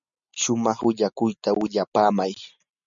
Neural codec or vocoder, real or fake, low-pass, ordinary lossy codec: none; real; 7.2 kHz; MP3, 64 kbps